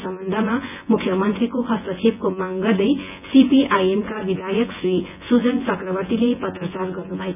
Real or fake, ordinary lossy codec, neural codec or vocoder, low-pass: fake; none; vocoder, 24 kHz, 100 mel bands, Vocos; 3.6 kHz